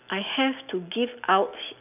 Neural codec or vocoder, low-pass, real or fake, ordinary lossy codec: none; 3.6 kHz; real; none